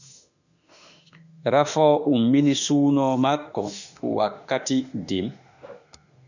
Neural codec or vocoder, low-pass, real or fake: autoencoder, 48 kHz, 32 numbers a frame, DAC-VAE, trained on Japanese speech; 7.2 kHz; fake